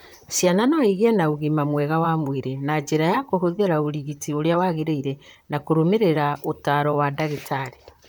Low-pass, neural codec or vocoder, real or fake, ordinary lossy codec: none; vocoder, 44.1 kHz, 128 mel bands, Pupu-Vocoder; fake; none